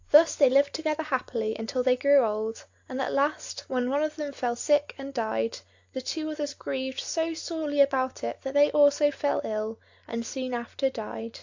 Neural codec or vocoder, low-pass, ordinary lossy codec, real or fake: none; 7.2 kHz; AAC, 48 kbps; real